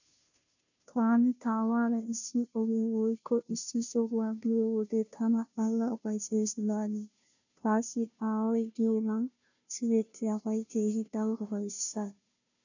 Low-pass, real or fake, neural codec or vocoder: 7.2 kHz; fake; codec, 16 kHz, 0.5 kbps, FunCodec, trained on Chinese and English, 25 frames a second